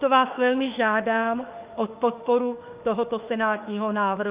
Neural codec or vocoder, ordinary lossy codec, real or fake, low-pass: autoencoder, 48 kHz, 32 numbers a frame, DAC-VAE, trained on Japanese speech; Opus, 24 kbps; fake; 3.6 kHz